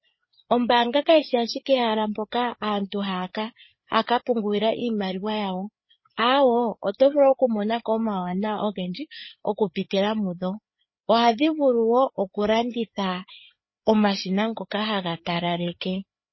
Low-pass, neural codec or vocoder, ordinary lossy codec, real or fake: 7.2 kHz; codec, 16 kHz, 4 kbps, FreqCodec, larger model; MP3, 24 kbps; fake